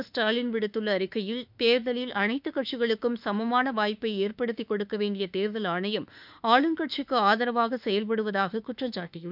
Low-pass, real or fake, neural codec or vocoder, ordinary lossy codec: 5.4 kHz; fake; autoencoder, 48 kHz, 32 numbers a frame, DAC-VAE, trained on Japanese speech; none